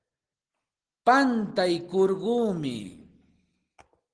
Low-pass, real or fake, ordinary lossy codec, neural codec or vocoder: 9.9 kHz; real; Opus, 16 kbps; none